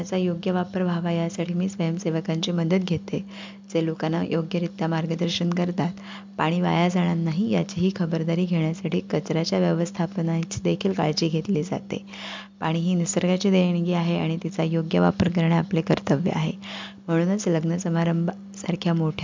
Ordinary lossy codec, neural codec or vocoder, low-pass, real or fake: MP3, 64 kbps; none; 7.2 kHz; real